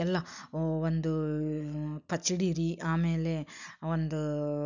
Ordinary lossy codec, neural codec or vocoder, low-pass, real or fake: none; none; 7.2 kHz; real